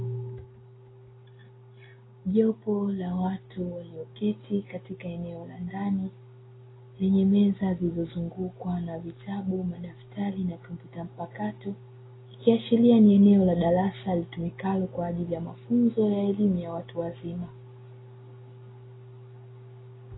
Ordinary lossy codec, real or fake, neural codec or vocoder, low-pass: AAC, 16 kbps; real; none; 7.2 kHz